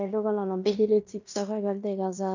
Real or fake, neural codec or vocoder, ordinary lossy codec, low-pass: fake; codec, 16 kHz in and 24 kHz out, 0.9 kbps, LongCat-Audio-Codec, fine tuned four codebook decoder; none; 7.2 kHz